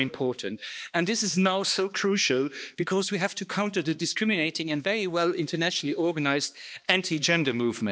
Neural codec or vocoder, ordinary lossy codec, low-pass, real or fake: codec, 16 kHz, 2 kbps, X-Codec, HuBERT features, trained on balanced general audio; none; none; fake